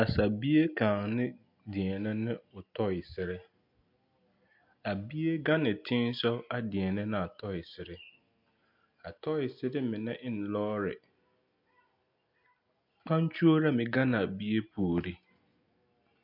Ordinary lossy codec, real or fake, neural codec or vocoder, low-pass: MP3, 48 kbps; real; none; 5.4 kHz